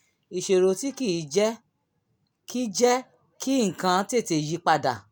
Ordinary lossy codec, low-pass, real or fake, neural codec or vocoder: none; none; real; none